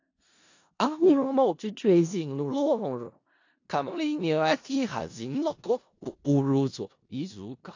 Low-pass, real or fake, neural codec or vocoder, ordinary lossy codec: 7.2 kHz; fake; codec, 16 kHz in and 24 kHz out, 0.4 kbps, LongCat-Audio-Codec, four codebook decoder; AAC, 48 kbps